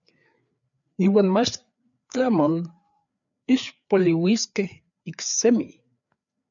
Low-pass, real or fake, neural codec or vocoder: 7.2 kHz; fake; codec, 16 kHz, 4 kbps, FreqCodec, larger model